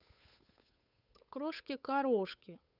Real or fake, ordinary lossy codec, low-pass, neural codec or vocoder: fake; none; 5.4 kHz; codec, 16 kHz, 8 kbps, FunCodec, trained on Chinese and English, 25 frames a second